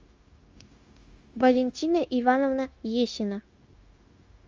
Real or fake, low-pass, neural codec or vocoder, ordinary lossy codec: fake; 7.2 kHz; codec, 16 kHz, 0.9 kbps, LongCat-Audio-Codec; Opus, 32 kbps